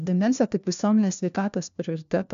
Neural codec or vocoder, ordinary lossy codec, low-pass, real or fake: codec, 16 kHz, 1 kbps, FunCodec, trained on LibriTTS, 50 frames a second; MP3, 64 kbps; 7.2 kHz; fake